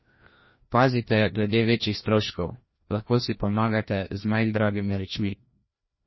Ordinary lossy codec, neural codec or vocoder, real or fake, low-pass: MP3, 24 kbps; codec, 16 kHz, 1 kbps, FreqCodec, larger model; fake; 7.2 kHz